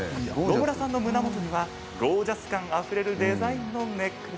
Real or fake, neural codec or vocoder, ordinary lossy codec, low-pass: real; none; none; none